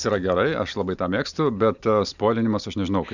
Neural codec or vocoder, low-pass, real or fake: none; 7.2 kHz; real